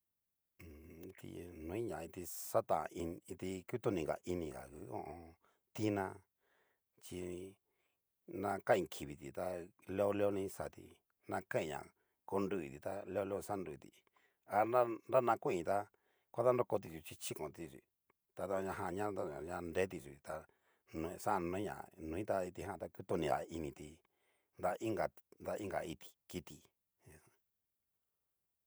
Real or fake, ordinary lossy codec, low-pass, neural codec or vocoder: fake; none; none; vocoder, 48 kHz, 128 mel bands, Vocos